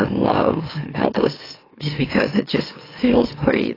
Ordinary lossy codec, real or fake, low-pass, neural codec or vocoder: AAC, 24 kbps; fake; 5.4 kHz; autoencoder, 44.1 kHz, a latent of 192 numbers a frame, MeloTTS